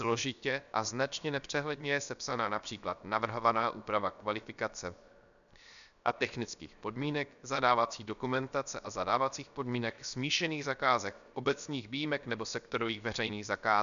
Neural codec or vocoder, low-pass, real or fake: codec, 16 kHz, 0.7 kbps, FocalCodec; 7.2 kHz; fake